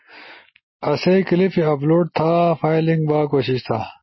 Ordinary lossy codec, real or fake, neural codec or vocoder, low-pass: MP3, 24 kbps; real; none; 7.2 kHz